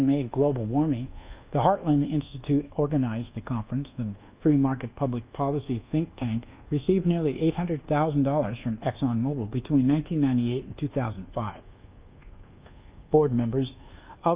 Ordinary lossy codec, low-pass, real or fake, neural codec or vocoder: Opus, 24 kbps; 3.6 kHz; fake; codec, 24 kHz, 1.2 kbps, DualCodec